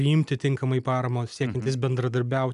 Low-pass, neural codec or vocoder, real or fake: 10.8 kHz; none; real